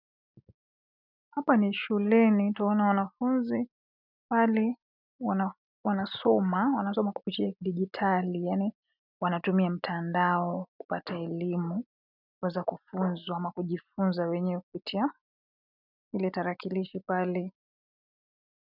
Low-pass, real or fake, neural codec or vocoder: 5.4 kHz; real; none